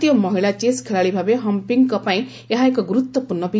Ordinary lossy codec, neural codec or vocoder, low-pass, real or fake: none; none; none; real